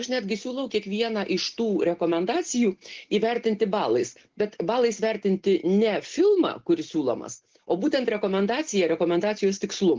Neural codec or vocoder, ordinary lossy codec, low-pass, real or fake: none; Opus, 16 kbps; 7.2 kHz; real